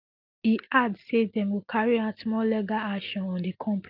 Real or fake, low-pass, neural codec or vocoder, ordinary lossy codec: real; 5.4 kHz; none; Opus, 24 kbps